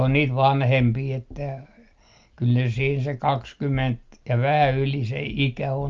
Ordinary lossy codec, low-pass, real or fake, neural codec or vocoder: Opus, 24 kbps; 7.2 kHz; real; none